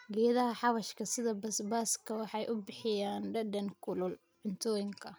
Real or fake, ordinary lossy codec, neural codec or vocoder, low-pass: real; none; none; none